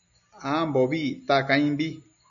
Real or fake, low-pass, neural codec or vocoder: real; 7.2 kHz; none